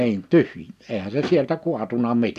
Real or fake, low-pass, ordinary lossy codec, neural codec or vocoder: real; 14.4 kHz; none; none